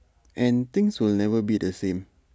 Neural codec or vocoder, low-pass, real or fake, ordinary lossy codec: none; none; real; none